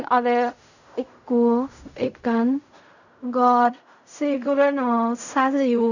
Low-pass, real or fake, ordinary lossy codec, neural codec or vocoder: 7.2 kHz; fake; none; codec, 16 kHz in and 24 kHz out, 0.4 kbps, LongCat-Audio-Codec, fine tuned four codebook decoder